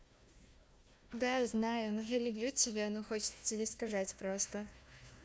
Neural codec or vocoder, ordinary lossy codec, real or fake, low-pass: codec, 16 kHz, 1 kbps, FunCodec, trained on Chinese and English, 50 frames a second; none; fake; none